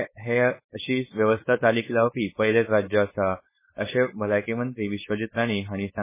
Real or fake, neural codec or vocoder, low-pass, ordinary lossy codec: fake; codec, 16 kHz, 4.8 kbps, FACodec; 3.6 kHz; MP3, 16 kbps